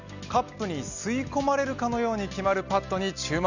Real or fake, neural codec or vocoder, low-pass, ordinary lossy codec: real; none; 7.2 kHz; none